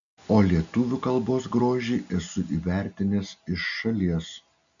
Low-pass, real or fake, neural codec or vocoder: 7.2 kHz; real; none